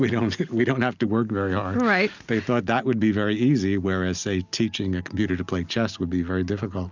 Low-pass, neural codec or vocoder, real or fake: 7.2 kHz; none; real